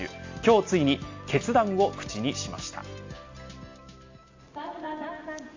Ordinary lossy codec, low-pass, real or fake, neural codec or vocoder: AAC, 48 kbps; 7.2 kHz; real; none